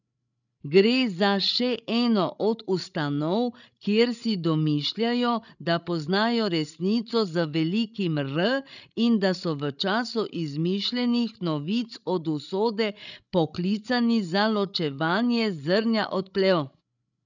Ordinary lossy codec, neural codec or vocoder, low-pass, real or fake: none; codec, 16 kHz, 16 kbps, FreqCodec, larger model; 7.2 kHz; fake